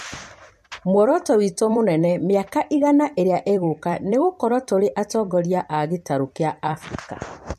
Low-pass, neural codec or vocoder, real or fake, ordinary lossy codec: 14.4 kHz; vocoder, 44.1 kHz, 128 mel bands every 256 samples, BigVGAN v2; fake; MP3, 64 kbps